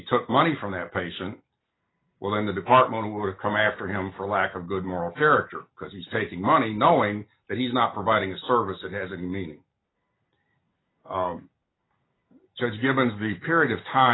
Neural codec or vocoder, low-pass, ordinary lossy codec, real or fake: none; 7.2 kHz; AAC, 16 kbps; real